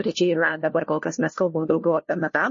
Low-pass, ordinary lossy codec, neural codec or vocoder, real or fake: 7.2 kHz; MP3, 32 kbps; codec, 16 kHz, 1 kbps, FunCodec, trained on LibriTTS, 50 frames a second; fake